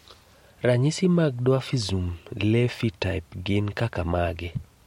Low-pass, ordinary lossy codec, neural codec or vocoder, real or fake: 19.8 kHz; MP3, 64 kbps; none; real